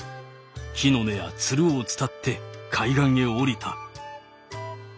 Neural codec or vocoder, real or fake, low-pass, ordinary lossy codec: none; real; none; none